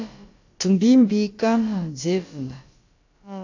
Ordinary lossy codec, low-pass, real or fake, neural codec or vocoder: AAC, 48 kbps; 7.2 kHz; fake; codec, 16 kHz, about 1 kbps, DyCAST, with the encoder's durations